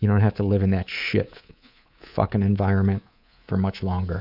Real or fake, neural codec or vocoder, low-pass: fake; codec, 24 kHz, 3.1 kbps, DualCodec; 5.4 kHz